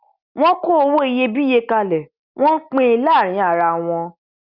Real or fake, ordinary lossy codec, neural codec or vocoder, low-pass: real; Opus, 64 kbps; none; 5.4 kHz